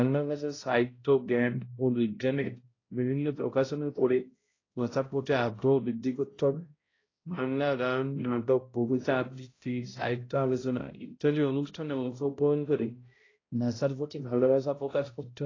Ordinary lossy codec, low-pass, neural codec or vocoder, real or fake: AAC, 32 kbps; 7.2 kHz; codec, 16 kHz, 0.5 kbps, X-Codec, HuBERT features, trained on balanced general audio; fake